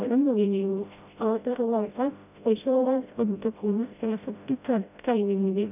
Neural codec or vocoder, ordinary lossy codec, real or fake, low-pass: codec, 16 kHz, 0.5 kbps, FreqCodec, smaller model; none; fake; 3.6 kHz